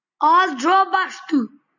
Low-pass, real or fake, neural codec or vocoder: 7.2 kHz; real; none